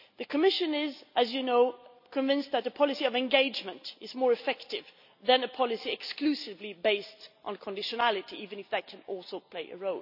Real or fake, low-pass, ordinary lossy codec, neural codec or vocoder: real; 5.4 kHz; none; none